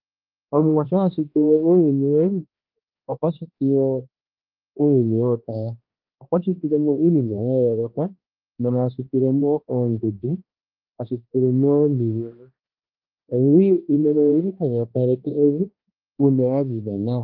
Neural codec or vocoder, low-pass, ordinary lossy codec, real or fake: codec, 16 kHz, 1 kbps, X-Codec, HuBERT features, trained on balanced general audio; 5.4 kHz; Opus, 24 kbps; fake